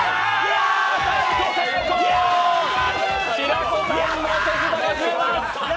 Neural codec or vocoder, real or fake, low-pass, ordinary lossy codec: none; real; none; none